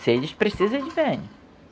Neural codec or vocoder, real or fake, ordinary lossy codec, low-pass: none; real; none; none